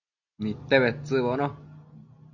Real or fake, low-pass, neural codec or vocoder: real; 7.2 kHz; none